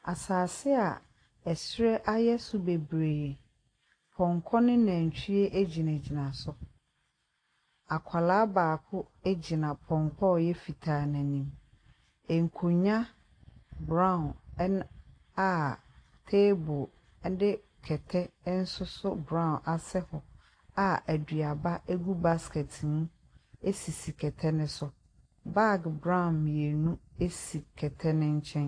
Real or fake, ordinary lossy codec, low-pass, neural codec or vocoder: real; AAC, 48 kbps; 9.9 kHz; none